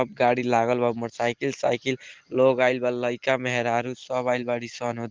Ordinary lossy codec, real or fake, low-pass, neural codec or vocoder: Opus, 16 kbps; real; 7.2 kHz; none